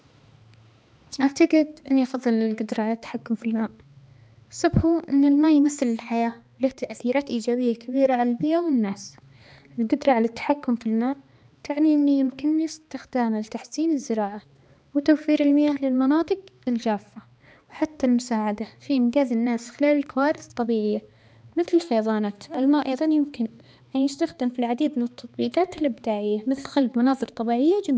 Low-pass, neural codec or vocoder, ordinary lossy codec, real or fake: none; codec, 16 kHz, 2 kbps, X-Codec, HuBERT features, trained on balanced general audio; none; fake